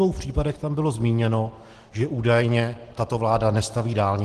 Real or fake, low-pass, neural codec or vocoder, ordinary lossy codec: real; 10.8 kHz; none; Opus, 16 kbps